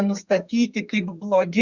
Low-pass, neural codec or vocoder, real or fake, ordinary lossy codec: 7.2 kHz; codec, 32 kHz, 1.9 kbps, SNAC; fake; Opus, 64 kbps